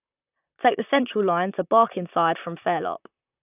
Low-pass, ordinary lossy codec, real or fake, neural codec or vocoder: 3.6 kHz; none; fake; vocoder, 44.1 kHz, 128 mel bands, Pupu-Vocoder